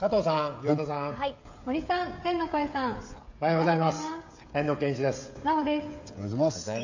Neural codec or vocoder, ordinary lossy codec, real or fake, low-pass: codec, 16 kHz, 16 kbps, FreqCodec, smaller model; AAC, 48 kbps; fake; 7.2 kHz